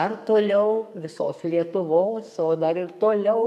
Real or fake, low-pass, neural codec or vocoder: fake; 14.4 kHz; codec, 44.1 kHz, 2.6 kbps, SNAC